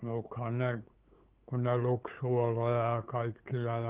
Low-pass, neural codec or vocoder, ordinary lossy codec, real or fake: 3.6 kHz; codec, 16 kHz, 8 kbps, FunCodec, trained on LibriTTS, 25 frames a second; Opus, 16 kbps; fake